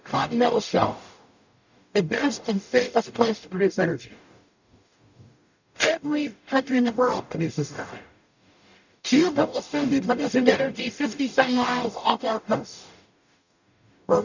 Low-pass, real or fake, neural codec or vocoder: 7.2 kHz; fake; codec, 44.1 kHz, 0.9 kbps, DAC